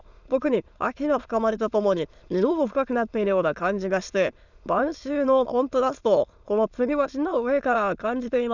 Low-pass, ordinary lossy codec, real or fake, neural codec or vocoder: 7.2 kHz; none; fake; autoencoder, 22.05 kHz, a latent of 192 numbers a frame, VITS, trained on many speakers